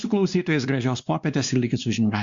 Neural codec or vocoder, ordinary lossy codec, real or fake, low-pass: codec, 16 kHz, 2 kbps, X-Codec, WavLM features, trained on Multilingual LibriSpeech; Opus, 64 kbps; fake; 7.2 kHz